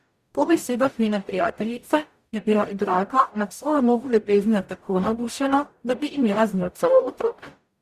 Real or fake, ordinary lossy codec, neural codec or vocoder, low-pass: fake; Opus, 64 kbps; codec, 44.1 kHz, 0.9 kbps, DAC; 14.4 kHz